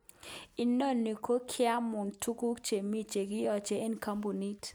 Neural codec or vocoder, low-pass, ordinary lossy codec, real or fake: none; none; none; real